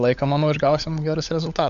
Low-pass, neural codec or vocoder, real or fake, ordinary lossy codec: 7.2 kHz; codec, 16 kHz, 16 kbps, FunCodec, trained on LibriTTS, 50 frames a second; fake; AAC, 96 kbps